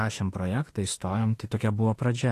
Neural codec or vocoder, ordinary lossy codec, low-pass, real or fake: autoencoder, 48 kHz, 32 numbers a frame, DAC-VAE, trained on Japanese speech; AAC, 48 kbps; 14.4 kHz; fake